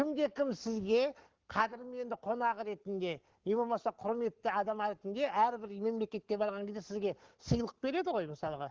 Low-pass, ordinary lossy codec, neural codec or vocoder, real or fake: 7.2 kHz; Opus, 16 kbps; codec, 44.1 kHz, 7.8 kbps, Pupu-Codec; fake